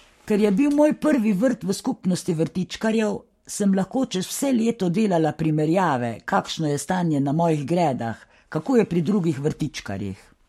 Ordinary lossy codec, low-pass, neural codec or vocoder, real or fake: MP3, 64 kbps; 19.8 kHz; codec, 44.1 kHz, 7.8 kbps, Pupu-Codec; fake